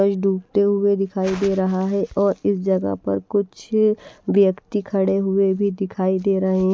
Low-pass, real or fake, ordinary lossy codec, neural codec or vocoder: none; real; none; none